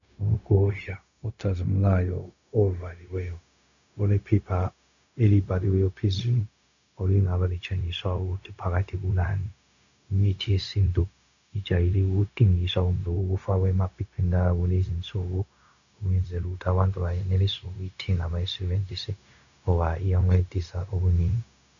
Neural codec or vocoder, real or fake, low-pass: codec, 16 kHz, 0.4 kbps, LongCat-Audio-Codec; fake; 7.2 kHz